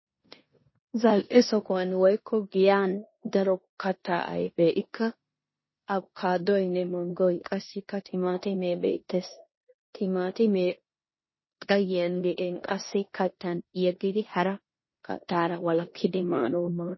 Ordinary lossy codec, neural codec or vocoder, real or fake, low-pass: MP3, 24 kbps; codec, 16 kHz in and 24 kHz out, 0.9 kbps, LongCat-Audio-Codec, four codebook decoder; fake; 7.2 kHz